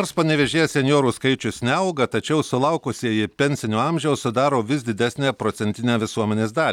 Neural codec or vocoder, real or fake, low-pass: none; real; 19.8 kHz